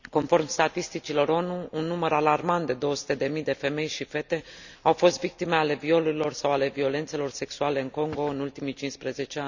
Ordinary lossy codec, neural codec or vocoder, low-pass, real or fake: none; none; 7.2 kHz; real